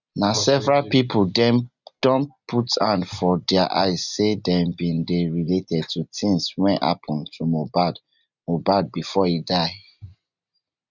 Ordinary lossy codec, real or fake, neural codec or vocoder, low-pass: none; real; none; 7.2 kHz